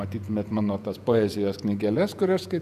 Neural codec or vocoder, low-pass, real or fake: vocoder, 48 kHz, 128 mel bands, Vocos; 14.4 kHz; fake